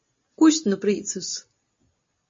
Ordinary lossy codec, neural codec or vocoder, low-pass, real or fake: MP3, 32 kbps; none; 7.2 kHz; real